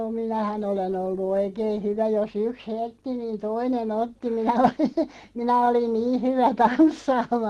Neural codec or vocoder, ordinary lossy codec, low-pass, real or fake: none; Opus, 16 kbps; 10.8 kHz; real